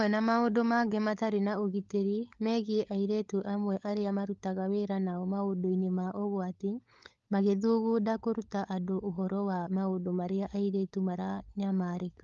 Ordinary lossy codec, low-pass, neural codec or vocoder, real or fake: Opus, 32 kbps; 7.2 kHz; codec, 16 kHz, 16 kbps, FunCodec, trained on LibriTTS, 50 frames a second; fake